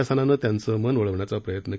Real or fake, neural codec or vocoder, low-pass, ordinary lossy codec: real; none; none; none